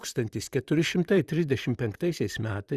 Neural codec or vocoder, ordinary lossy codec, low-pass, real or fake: vocoder, 44.1 kHz, 128 mel bands, Pupu-Vocoder; Opus, 64 kbps; 14.4 kHz; fake